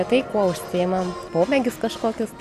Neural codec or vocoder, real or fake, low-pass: none; real; 14.4 kHz